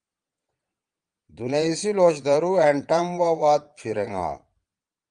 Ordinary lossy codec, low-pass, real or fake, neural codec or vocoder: Opus, 32 kbps; 9.9 kHz; fake; vocoder, 22.05 kHz, 80 mel bands, Vocos